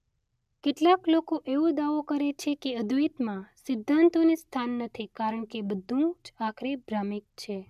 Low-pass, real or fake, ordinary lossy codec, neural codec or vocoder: 14.4 kHz; real; none; none